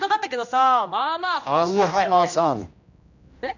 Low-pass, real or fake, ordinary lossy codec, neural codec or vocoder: 7.2 kHz; fake; none; codec, 16 kHz, 1 kbps, X-Codec, HuBERT features, trained on general audio